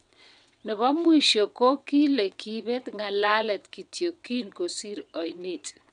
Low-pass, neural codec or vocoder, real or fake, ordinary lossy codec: 9.9 kHz; vocoder, 22.05 kHz, 80 mel bands, WaveNeXt; fake; MP3, 96 kbps